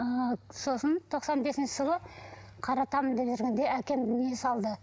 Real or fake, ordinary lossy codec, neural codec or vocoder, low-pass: real; none; none; none